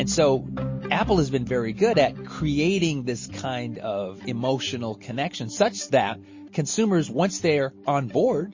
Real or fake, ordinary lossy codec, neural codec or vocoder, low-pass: real; MP3, 32 kbps; none; 7.2 kHz